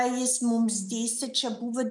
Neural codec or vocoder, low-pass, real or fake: none; 10.8 kHz; real